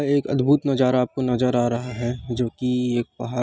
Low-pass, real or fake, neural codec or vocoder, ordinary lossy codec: none; real; none; none